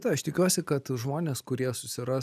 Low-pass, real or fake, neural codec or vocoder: 14.4 kHz; real; none